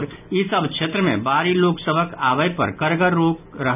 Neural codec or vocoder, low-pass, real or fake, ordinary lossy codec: none; 3.6 kHz; real; none